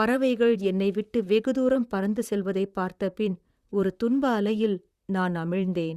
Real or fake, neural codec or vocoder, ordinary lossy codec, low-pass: fake; vocoder, 44.1 kHz, 128 mel bands, Pupu-Vocoder; Opus, 64 kbps; 14.4 kHz